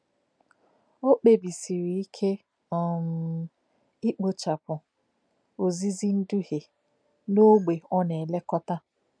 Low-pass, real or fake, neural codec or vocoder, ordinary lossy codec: 9.9 kHz; real; none; none